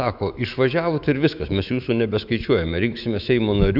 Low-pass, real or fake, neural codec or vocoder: 5.4 kHz; fake; autoencoder, 48 kHz, 128 numbers a frame, DAC-VAE, trained on Japanese speech